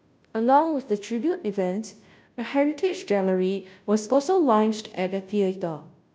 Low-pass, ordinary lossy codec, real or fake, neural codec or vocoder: none; none; fake; codec, 16 kHz, 0.5 kbps, FunCodec, trained on Chinese and English, 25 frames a second